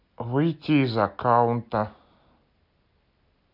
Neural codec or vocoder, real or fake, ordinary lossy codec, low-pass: none; real; none; 5.4 kHz